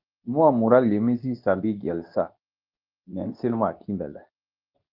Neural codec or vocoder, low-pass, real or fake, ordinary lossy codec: codec, 24 kHz, 0.9 kbps, WavTokenizer, medium speech release version 2; 5.4 kHz; fake; Opus, 24 kbps